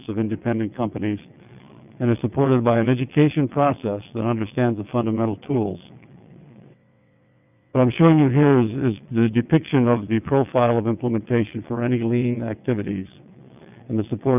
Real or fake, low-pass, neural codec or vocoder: fake; 3.6 kHz; vocoder, 22.05 kHz, 80 mel bands, WaveNeXt